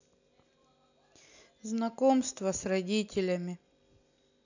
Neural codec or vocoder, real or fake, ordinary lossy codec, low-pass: none; real; none; 7.2 kHz